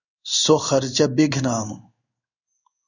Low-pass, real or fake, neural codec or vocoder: 7.2 kHz; fake; codec, 16 kHz in and 24 kHz out, 1 kbps, XY-Tokenizer